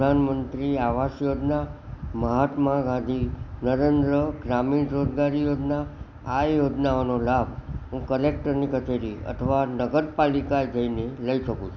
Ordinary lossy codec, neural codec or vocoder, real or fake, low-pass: none; none; real; 7.2 kHz